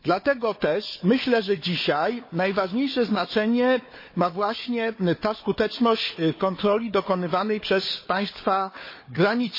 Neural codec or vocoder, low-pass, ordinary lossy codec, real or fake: codec, 16 kHz, 4 kbps, FunCodec, trained on Chinese and English, 50 frames a second; 5.4 kHz; MP3, 24 kbps; fake